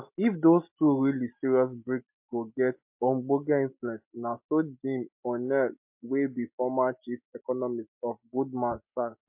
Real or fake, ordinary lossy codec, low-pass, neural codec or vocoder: real; MP3, 32 kbps; 3.6 kHz; none